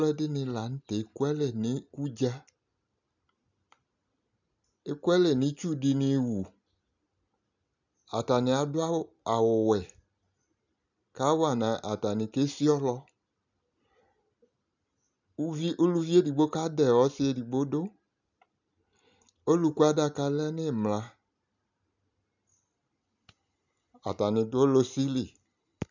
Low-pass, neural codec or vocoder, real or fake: 7.2 kHz; none; real